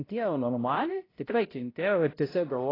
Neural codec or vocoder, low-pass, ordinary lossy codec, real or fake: codec, 16 kHz, 0.5 kbps, X-Codec, HuBERT features, trained on balanced general audio; 5.4 kHz; AAC, 24 kbps; fake